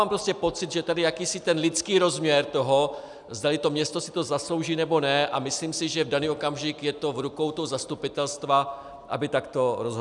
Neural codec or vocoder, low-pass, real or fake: none; 10.8 kHz; real